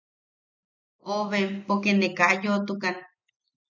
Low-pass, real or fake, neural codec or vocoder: 7.2 kHz; real; none